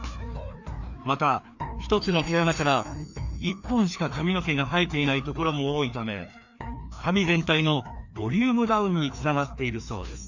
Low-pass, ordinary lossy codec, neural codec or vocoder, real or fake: 7.2 kHz; none; codec, 16 kHz, 2 kbps, FreqCodec, larger model; fake